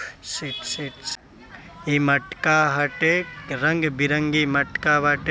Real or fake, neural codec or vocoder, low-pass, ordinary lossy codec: real; none; none; none